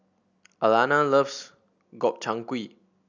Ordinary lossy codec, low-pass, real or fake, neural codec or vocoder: none; 7.2 kHz; real; none